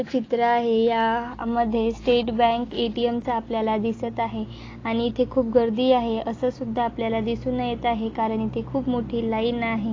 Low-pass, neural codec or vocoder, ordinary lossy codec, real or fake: 7.2 kHz; none; AAC, 32 kbps; real